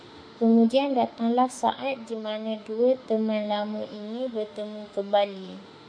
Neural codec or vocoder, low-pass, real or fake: autoencoder, 48 kHz, 32 numbers a frame, DAC-VAE, trained on Japanese speech; 9.9 kHz; fake